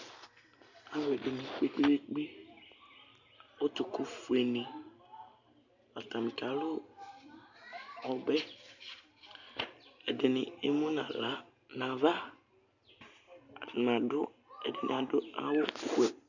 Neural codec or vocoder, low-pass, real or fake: none; 7.2 kHz; real